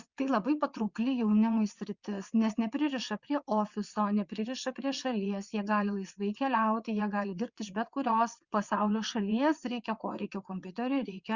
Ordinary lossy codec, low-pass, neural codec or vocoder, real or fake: Opus, 64 kbps; 7.2 kHz; vocoder, 22.05 kHz, 80 mel bands, WaveNeXt; fake